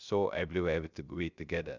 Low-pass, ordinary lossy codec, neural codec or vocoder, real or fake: 7.2 kHz; none; codec, 16 kHz, 0.3 kbps, FocalCodec; fake